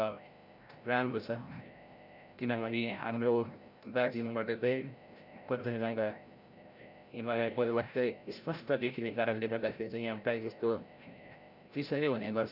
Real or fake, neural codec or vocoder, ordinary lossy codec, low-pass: fake; codec, 16 kHz, 0.5 kbps, FreqCodec, larger model; none; 5.4 kHz